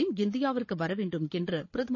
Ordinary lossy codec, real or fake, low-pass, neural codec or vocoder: MP3, 32 kbps; fake; 7.2 kHz; codec, 16 kHz, 8 kbps, FreqCodec, larger model